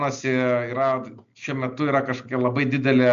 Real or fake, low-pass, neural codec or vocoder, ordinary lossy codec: real; 7.2 kHz; none; MP3, 64 kbps